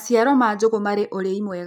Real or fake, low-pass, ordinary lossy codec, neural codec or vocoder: real; none; none; none